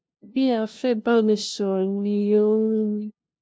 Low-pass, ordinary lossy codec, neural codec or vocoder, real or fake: none; none; codec, 16 kHz, 0.5 kbps, FunCodec, trained on LibriTTS, 25 frames a second; fake